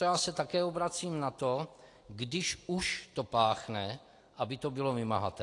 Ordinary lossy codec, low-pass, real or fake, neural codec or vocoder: AAC, 48 kbps; 10.8 kHz; real; none